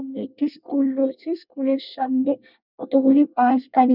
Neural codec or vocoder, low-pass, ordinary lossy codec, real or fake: codec, 24 kHz, 1 kbps, SNAC; 5.4 kHz; none; fake